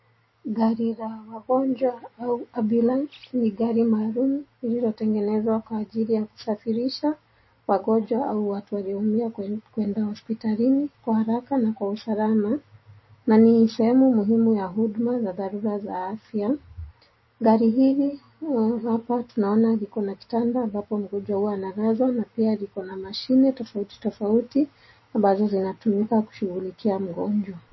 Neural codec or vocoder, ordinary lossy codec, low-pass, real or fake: none; MP3, 24 kbps; 7.2 kHz; real